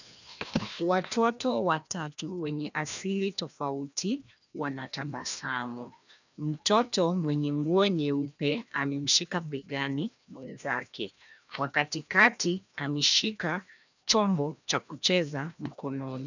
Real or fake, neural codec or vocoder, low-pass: fake; codec, 16 kHz, 1 kbps, FreqCodec, larger model; 7.2 kHz